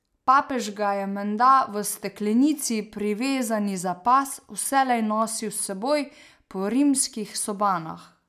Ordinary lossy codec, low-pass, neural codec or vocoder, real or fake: none; 14.4 kHz; none; real